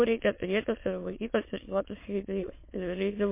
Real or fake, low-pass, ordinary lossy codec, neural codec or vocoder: fake; 3.6 kHz; MP3, 24 kbps; autoencoder, 22.05 kHz, a latent of 192 numbers a frame, VITS, trained on many speakers